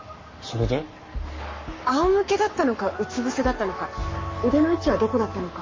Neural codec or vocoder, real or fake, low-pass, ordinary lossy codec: codec, 44.1 kHz, 7.8 kbps, Pupu-Codec; fake; 7.2 kHz; MP3, 32 kbps